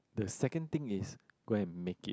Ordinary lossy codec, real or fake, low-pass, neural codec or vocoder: none; real; none; none